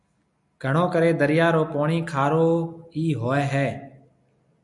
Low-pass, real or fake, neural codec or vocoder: 10.8 kHz; real; none